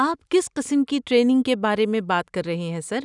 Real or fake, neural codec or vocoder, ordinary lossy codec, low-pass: real; none; none; 10.8 kHz